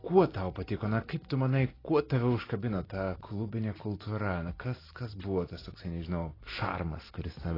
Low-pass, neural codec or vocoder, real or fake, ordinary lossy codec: 5.4 kHz; none; real; AAC, 24 kbps